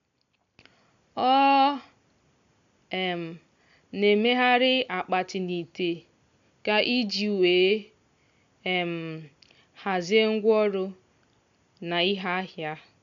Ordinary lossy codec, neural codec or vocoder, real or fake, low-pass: MP3, 64 kbps; none; real; 7.2 kHz